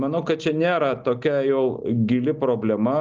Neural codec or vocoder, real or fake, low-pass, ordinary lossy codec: none; real; 7.2 kHz; Opus, 24 kbps